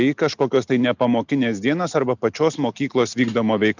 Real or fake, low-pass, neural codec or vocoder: real; 7.2 kHz; none